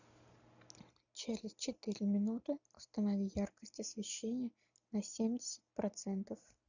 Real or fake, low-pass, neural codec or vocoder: real; 7.2 kHz; none